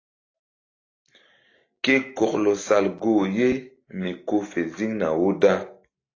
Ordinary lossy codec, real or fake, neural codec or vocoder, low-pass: AAC, 32 kbps; real; none; 7.2 kHz